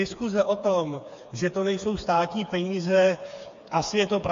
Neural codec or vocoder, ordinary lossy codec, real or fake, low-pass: codec, 16 kHz, 4 kbps, FreqCodec, smaller model; AAC, 48 kbps; fake; 7.2 kHz